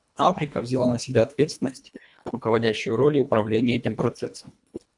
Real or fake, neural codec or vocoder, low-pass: fake; codec, 24 kHz, 1.5 kbps, HILCodec; 10.8 kHz